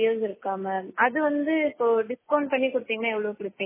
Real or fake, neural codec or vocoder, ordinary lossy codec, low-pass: real; none; MP3, 16 kbps; 3.6 kHz